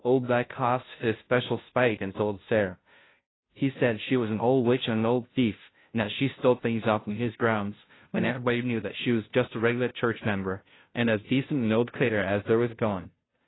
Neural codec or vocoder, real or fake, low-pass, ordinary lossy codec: codec, 16 kHz, 0.5 kbps, FunCodec, trained on Chinese and English, 25 frames a second; fake; 7.2 kHz; AAC, 16 kbps